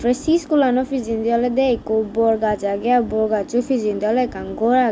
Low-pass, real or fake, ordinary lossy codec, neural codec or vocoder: none; real; none; none